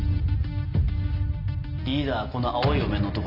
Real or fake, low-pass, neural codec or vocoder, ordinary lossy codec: real; 5.4 kHz; none; none